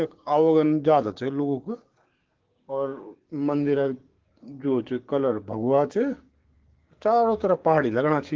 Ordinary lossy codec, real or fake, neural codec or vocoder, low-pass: Opus, 16 kbps; fake; codec, 16 kHz, 6 kbps, DAC; 7.2 kHz